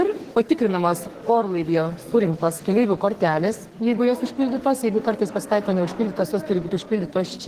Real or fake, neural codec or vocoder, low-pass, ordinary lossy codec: fake; codec, 44.1 kHz, 2.6 kbps, SNAC; 14.4 kHz; Opus, 16 kbps